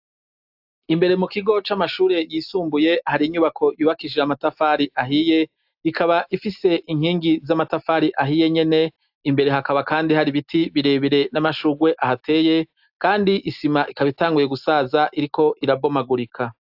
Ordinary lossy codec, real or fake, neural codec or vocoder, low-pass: AAC, 48 kbps; real; none; 5.4 kHz